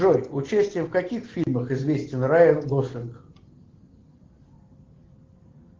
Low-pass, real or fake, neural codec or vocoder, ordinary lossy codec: 7.2 kHz; real; none; Opus, 16 kbps